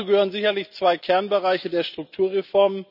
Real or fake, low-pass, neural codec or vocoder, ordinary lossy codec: real; 5.4 kHz; none; none